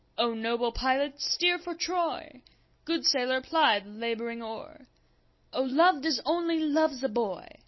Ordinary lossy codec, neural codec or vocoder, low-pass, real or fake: MP3, 24 kbps; none; 7.2 kHz; real